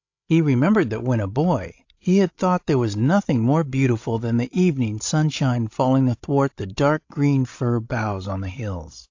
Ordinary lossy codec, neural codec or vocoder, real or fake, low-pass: AAC, 48 kbps; codec, 16 kHz, 16 kbps, FreqCodec, larger model; fake; 7.2 kHz